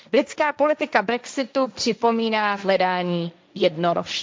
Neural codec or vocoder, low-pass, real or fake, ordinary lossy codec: codec, 16 kHz, 1.1 kbps, Voila-Tokenizer; none; fake; none